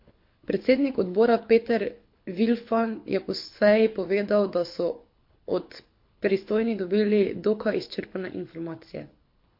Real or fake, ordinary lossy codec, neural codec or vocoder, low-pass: fake; MP3, 32 kbps; codec, 24 kHz, 6 kbps, HILCodec; 5.4 kHz